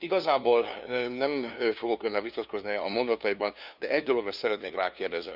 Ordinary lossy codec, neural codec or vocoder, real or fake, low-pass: none; codec, 16 kHz, 2 kbps, FunCodec, trained on LibriTTS, 25 frames a second; fake; 5.4 kHz